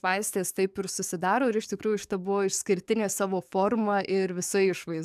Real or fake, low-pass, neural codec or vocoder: fake; 14.4 kHz; codec, 44.1 kHz, 7.8 kbps, DAC